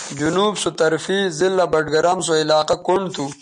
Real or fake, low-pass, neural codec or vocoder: real; 9.9 kHz; none